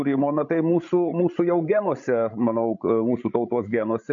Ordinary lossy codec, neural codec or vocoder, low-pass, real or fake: MP3, 48 kbps; codec, 16 kHz, 16 kbps, FreqCodec, larger model; 7.2 kHz; fake